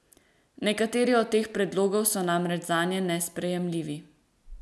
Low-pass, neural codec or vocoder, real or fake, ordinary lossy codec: none; none; real; none